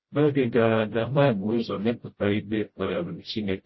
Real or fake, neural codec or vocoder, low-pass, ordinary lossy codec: fake; codec, 16 kHz, 0.5 kbps, FreqCodec, smaller model; 7.2 kHz; MP3, 24 kbps